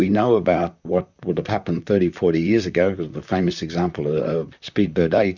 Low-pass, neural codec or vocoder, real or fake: 7.2 kHz; vocoder, 44.1 kHz, 128 mel bands, Pupu-Vocoder; fake